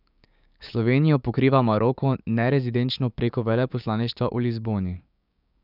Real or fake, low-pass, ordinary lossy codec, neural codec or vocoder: fake; 5.4 kHz; none; codec, 16 kHz, 6 kbps, DAC